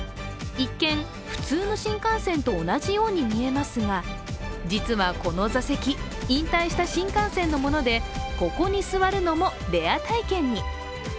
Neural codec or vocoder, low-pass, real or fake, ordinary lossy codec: none; none; real; none